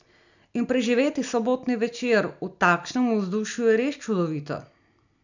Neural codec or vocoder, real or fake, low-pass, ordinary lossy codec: none; real; 7.2 kHz; none